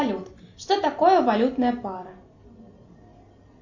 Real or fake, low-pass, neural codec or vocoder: real; 7.2 kHz; none